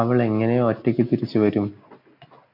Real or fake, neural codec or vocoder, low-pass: real; none; 5.4 kHz